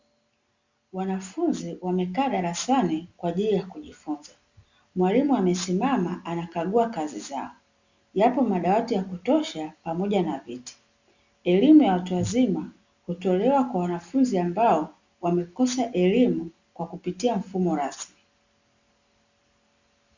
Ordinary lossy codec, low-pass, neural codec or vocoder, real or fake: Opus, 64 kbps; 7.2 kHz; none; real